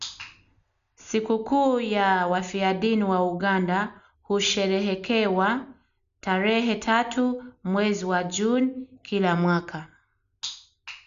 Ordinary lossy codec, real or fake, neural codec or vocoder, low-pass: none; real; none; 7.2 kHz